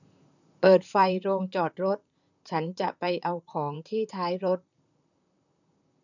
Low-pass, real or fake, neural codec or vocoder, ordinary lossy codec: 7.2 kHz; fake; vocoder, 44.1 kHz, 128 mel bands, Pupu-Vocoder; none